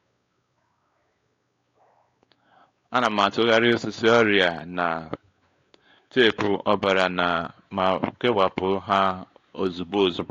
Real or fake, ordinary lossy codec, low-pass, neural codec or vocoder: fake; AAC, 48 kbps; 7.2 kHz; codec, 16 kHz, 4 kbps, X-Codec, WavLM features, trained on Multilingual LibriSpeech